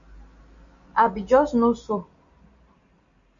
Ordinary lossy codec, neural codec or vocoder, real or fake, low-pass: MP3, 64 kbps; none; real; 7.2 kHz